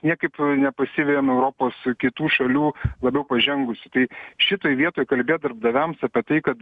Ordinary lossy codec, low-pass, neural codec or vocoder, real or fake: Opus, 64 kbps; 10.8 kHz; none; real